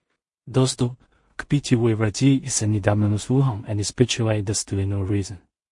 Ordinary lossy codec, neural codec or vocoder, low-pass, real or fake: MP3, 48 kbps; codec, 16 kHz in and 24 kHz out, 0.4 kbps, LongCat-Audio-Codec, two codebook decoder; 10.8 kHz; fake